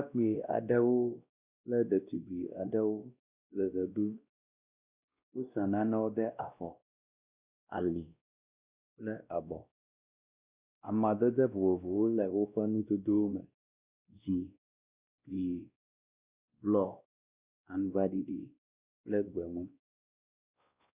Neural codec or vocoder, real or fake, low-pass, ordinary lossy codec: codec, 16 kHz, 1 kbps, X-Codec, WavLM features, trained on Multilingual LibriSpeech; fake; 3.6 kHz; Opus, 64 kbps